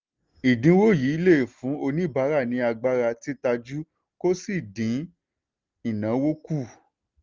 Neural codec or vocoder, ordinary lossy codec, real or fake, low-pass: none; Opus, 32 kbps; real; 7.2 kHz